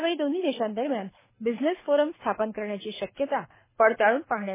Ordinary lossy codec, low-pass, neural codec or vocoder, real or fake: MP3, 16 kbps; 3.6 kHz; codec, 24 kHz, 6 kbps, HILCodec; fake